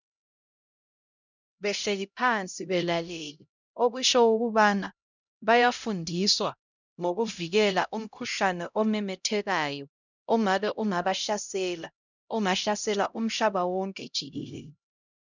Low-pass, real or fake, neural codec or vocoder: 7.2 kHz; fake; codec, 16 kHz, 0.5 kbps, X-Codec, HuBERT features, trained on LibriSpeech